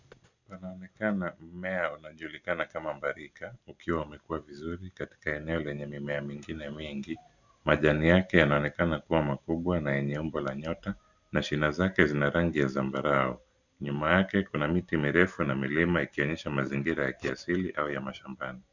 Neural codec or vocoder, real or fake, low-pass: none; real; 7.2 kHz